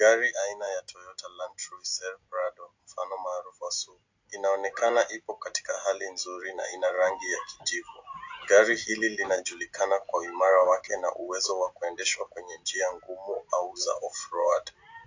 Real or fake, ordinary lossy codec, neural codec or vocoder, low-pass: real; AAC, 48 kbps; none; 7.2 kHz